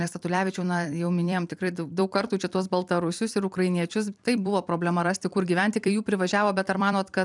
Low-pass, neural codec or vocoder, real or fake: 10.8 kHz; vocoder, 44.1 kHz, 128 mel bands every 256 samples, BigVGAN v2; fake